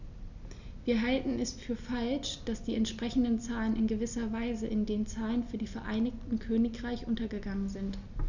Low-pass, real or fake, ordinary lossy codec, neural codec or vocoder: 7.2 kHz; real; none; none